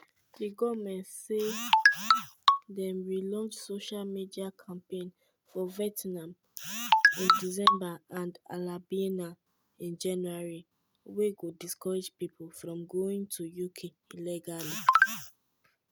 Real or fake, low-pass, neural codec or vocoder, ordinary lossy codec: real; none; none; none